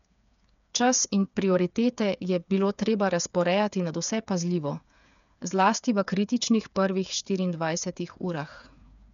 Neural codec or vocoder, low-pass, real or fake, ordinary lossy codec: codec, 16 kHz, 8 kbps, FreqCodec, smaller model; 7.2 kHz; fake; none